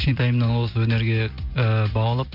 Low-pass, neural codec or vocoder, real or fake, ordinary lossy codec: 5.4 kHz; none; real; none